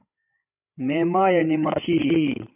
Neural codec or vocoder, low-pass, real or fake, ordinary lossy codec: codec, 16 kHz, 8 kbps, FreqCodec, larger model; 3.6 kHz; fake; AAC, 32 kbps